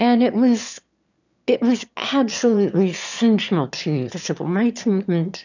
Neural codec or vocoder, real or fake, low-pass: autoencoder, 22.05 kHz, a latent of 192 numbers a frame, VITS, trained on one speaker; fake; 7.2 kHz